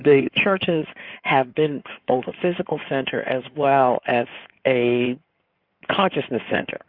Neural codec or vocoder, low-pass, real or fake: codec, 16 kHz in and 24 kHz out, 2.2 kbps, FireRedTTS-2 codec; 5.4 kHz; fake